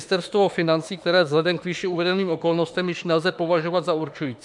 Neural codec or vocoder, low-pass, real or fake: autoencoder, 48 kHz, 32 numbers a frame, DAC-VAE, trained on Japanese speech; 10.8 kHz; fake